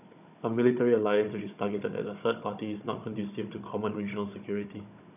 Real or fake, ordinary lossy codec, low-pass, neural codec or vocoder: fake; none; 3.6 kHz; codec, 16 kHz, 16 kbps, FunCodec, trained on Chinese and English, 50 frames a second